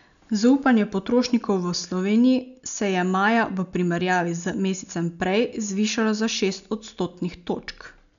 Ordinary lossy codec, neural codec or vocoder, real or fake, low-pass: none; none; real; 7.2 kHz